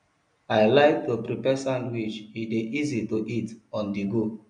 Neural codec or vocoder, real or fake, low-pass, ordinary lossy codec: none; real; 9.9 kHz; MP3, 96 kbps